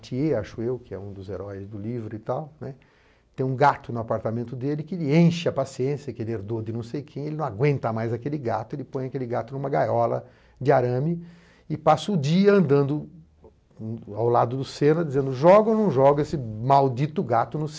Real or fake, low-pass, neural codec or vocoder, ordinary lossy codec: real; none; none; none